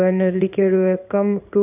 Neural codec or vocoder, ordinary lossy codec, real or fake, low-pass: codec, 16 kHz in and 24 kHz out, 1 kbps, XY-Tokenizer; none; fake; 3.6 kHz